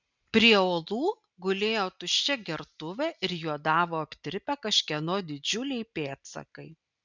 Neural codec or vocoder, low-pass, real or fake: none; 7.2 kHz; real